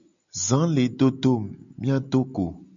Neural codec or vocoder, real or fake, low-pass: none; real; 7.2 kHz